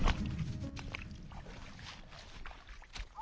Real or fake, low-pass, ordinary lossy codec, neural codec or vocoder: real; none; none; none